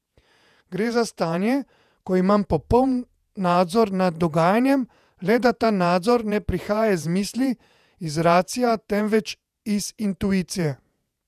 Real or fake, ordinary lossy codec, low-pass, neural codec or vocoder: fake; none; 14.4 kHz; vocoder, 48 kHz, 128 mel bands, Vocos